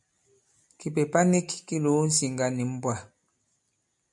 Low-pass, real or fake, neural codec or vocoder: 10.8 kHz; real; none